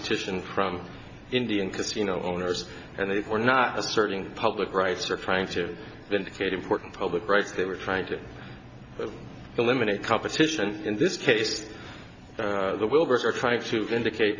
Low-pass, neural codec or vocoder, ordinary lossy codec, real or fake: 7.2 kHz; none; AAC, 48 kbps; real